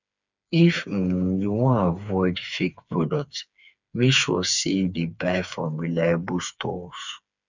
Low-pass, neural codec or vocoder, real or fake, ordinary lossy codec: 7.2 kHz; codec, 16 kHz, 4 kbps, FreqCodec, smaller model; fake; none